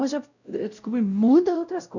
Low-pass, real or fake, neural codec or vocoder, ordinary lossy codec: 7.2 kHz; fake; codec, 16 kHz, 0.5 kbps, X-Codec, WavLM features, trained on Multilingual LibriSpeech; none